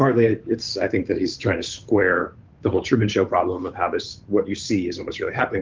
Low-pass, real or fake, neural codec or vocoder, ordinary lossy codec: 7.2 kHz; fake; codec, 16 kHz, 8 kbps, FunCodec, trained on Chinese and English, 25 frames a second; Opus, 32 kbps